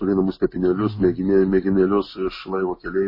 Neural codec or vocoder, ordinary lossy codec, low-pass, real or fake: none; MP3, 24 kbps; 5.4 kHz; real